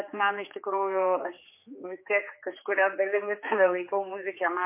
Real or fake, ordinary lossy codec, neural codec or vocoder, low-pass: fake; MP3, 24 kbps; codec, 16 kHz, 4 kbps, X-Codec, HuBERT features, trained on general audio; 3.6 kHz